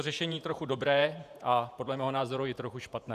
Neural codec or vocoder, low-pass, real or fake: vocoder, 48 kHz, 128 mel bands, Vocos; 14.4 kHz; fake